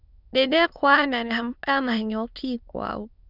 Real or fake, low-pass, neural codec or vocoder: fake; 5.4 kHz; autoencoder, 22.05 kHz, a latent of 192 numbers a frame, VITS, trained on many speakers